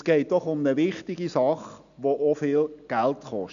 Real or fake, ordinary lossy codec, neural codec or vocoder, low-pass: real; none; none; 7.2 kHz